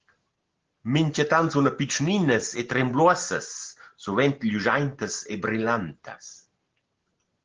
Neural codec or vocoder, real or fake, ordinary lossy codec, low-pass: none; real; Opus, 16 kbps; 7.2 kHz